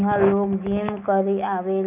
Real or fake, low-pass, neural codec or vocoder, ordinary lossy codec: fake; 3.6 kHz; autoencoder, 48 kHz, 128 numbers a frame, DAC-VAE, trained on Japanese speech; none